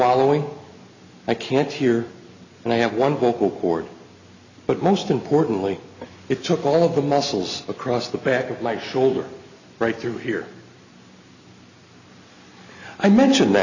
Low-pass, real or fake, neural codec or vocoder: 7.2 kHz; real; none